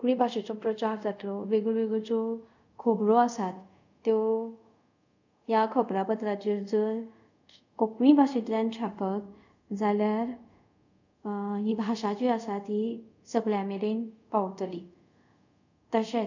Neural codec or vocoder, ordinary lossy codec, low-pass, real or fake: codec, 24 kHz, 0.5 kbps, DualCodec; none; 7.2 kHz; fake